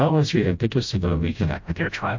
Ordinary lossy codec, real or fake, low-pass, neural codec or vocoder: MP3, 48 kbps; fake; 7.2 kHz; codec, 16 kHz, 0.5 kbps, FreqCodec, smaller model